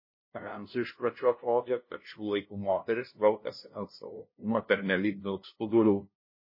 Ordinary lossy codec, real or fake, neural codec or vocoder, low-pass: MP3, 24 kbps; fake; codec, 16 kHz, 0.5 kbps, FunCodec, trained on LibriTTS, 25 frames a second; 5.4 kHz